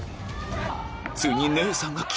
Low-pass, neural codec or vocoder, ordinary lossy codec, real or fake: none; none; none; real